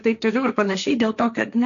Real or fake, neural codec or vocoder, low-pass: fake; codec, 16 kHz, 1.1 kbps, Voila-Tokenizer; 7.2 kHz